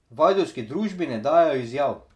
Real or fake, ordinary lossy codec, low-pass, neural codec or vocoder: real; none; none; none